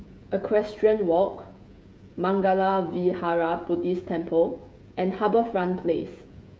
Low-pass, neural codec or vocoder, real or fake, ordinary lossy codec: none; codec, 16 kHz, 16 kbps, FreqCodec, smaller model; fake; none